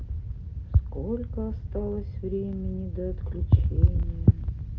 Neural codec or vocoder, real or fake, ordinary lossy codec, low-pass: none; real; Opus, 32 kbps; 7.2 kHz